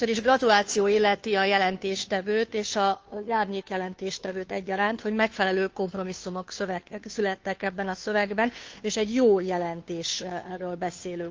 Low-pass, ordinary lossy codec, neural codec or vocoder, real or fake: 7.2 kHz; Opus, 24 kbps; codec, 16 kHz, 2 kbps, FunCodec, trained on Chinese and English, 25 frames a second; fake